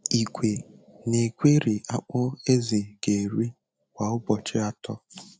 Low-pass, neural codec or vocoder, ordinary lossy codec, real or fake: none; none; none; real